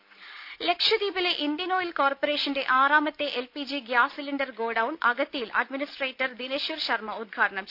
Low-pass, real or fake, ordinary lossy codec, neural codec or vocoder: 5.4 kHz; real; none; none